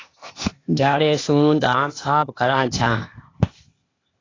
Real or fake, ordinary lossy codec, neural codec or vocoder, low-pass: fake; AAC, 32 kbps; codec, 16 kHz, 0.8 kbps, ZipCodec; 7.2 kHz